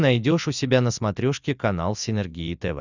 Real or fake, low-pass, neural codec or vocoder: real; 7.2 kHz; none